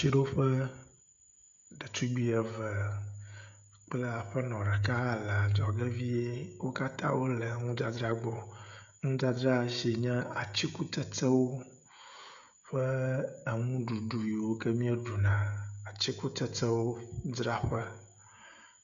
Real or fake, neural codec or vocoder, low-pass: fake; codec, 16 kHz, 16 kbps, FreqCodec, smaller model; 7.2 kHz